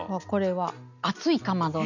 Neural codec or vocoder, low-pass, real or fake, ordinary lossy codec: none; 7.2 kHz; real; none